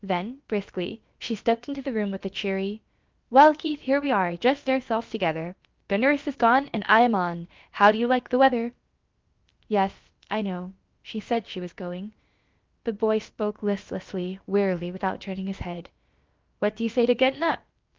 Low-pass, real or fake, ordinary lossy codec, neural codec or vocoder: 7.2 kHz; fake; Opus, 24 kbps; codec, 16 kHz, about 1 kbps, DyCAST, with the encoder's durations